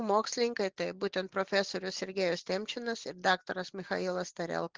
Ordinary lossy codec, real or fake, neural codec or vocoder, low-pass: Opus, 16 kbps; real; none; 7.2 kHz